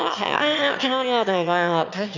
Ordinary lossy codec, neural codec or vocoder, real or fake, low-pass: none; autoencoder, 22.05 kHz, a latent of 192 numbers a frame, VITS, trained on one speaker; fake; 7.2 kHz